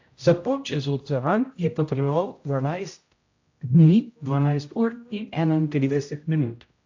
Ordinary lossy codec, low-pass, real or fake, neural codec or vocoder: AAC, 48 kbps; 7.2 kHz; fake; codec, 16 kHz, 0.5 kbps, X-Codec, HuBERT features, trained on general audio